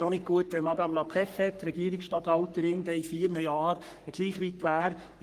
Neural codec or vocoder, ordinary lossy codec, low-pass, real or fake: codec, 44.1 kHz, 3.4 kbps, Pupu-Codec; Opus, 32 kbps; 14.4 kHz; fake